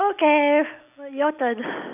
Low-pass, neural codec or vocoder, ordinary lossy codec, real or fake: 3.6 kHz; none; none; real